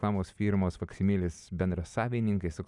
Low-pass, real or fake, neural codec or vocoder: 10.8 kHz; real; none